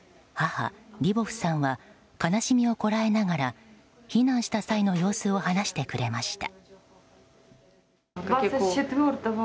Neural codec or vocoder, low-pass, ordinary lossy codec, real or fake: none; none; none; real